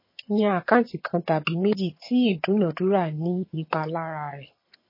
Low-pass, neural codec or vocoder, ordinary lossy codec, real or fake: 5.4 kHz; vocoder, 22.05 kHz, 80 mel bands, HiFi-GAN; MP3, 24 kbps; fake